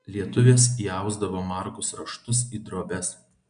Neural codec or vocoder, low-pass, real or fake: none; 9.9 kHz; real